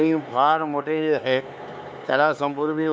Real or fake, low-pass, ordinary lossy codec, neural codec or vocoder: fake; none; none; codec, 16 kHz, 4 kbps, X-Codec, HuBERT features, trained on LibriSpeech